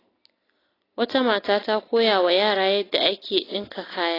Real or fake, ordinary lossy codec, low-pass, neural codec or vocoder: real; AAC, 24 kbps; 5.4 kHz; none